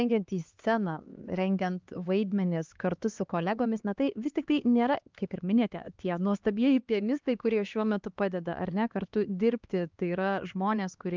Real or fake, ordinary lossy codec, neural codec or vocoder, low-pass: fake; Opus, 32 kbps; codec, 16 kHz, 2 kbps, X-Codec, HuBERT features, trained on LibriSpeech; 7.2 kHz